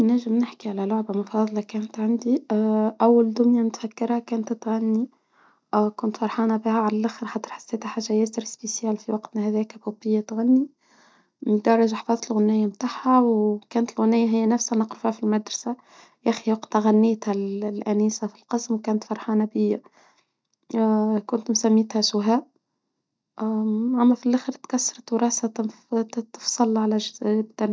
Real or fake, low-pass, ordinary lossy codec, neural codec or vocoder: real; none; none; none